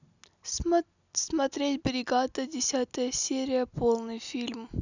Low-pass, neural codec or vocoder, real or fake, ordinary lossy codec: 7.2 kHz; none; real; none